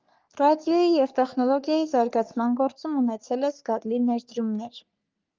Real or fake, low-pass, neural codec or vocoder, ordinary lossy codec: fake; 7.2 kHz; codec, 44.1 kHz, 3.4 kbps, Pupu-Codec; Opus, 24 kbps